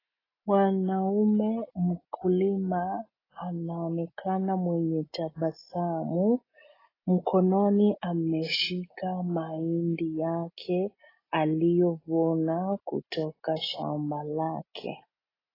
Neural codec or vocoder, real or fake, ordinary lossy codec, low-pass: none; real; AAC, 24 kbps; 5.4 kHz